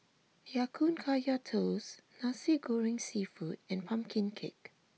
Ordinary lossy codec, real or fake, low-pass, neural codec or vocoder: none; real; none; none